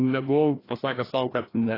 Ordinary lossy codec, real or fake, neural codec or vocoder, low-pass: AAC, 24 kbps; fake; codec, 16 kHz, 1 kbps, FreqCodec, larger model; 5.4 kHz